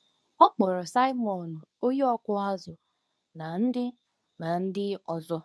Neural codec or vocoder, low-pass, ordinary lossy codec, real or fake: codec, 24 kHz, 0.9 kbps, WavTokenizer, medium speech release version 2; none; none; fake